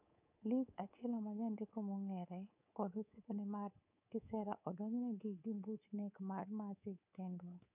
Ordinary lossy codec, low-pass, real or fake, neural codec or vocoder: none; 3.6 kHz; fake; codec, 24 kHz, 3.1 kbps, DualCodec